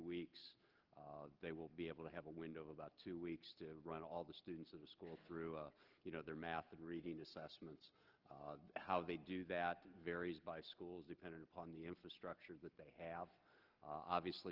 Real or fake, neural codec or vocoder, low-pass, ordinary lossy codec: real; none; 5.4 kHz; Opus, 16 kbps